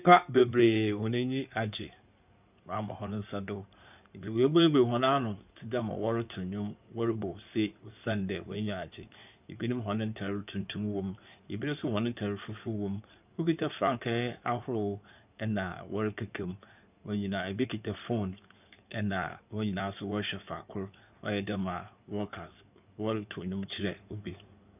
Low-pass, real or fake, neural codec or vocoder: 3.6 kHz; fake; codec, 16 kHz in and 24 kHz out, 2.2 kbps, FireRedTTS-2 codec